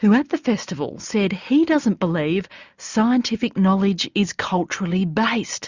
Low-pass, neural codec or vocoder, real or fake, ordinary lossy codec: 7.2 kHz; none; real; Opus, 64 kbps